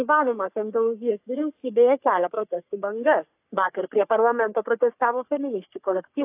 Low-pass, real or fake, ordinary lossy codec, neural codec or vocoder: 3.6 kHz; fake; AAC, 32 kbps; codec, 44.1 kHz, 3.4 kbps, Pupu-Codec